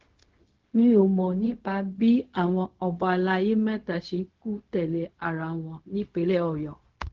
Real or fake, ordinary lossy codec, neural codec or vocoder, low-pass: fake; Opus, 16 kbps; codec, 16 kHz, 0.4 kbps, LongCat-Audio-Codec; 7.2 kHz